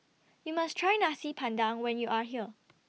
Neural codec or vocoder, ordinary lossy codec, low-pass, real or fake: none; none; none; real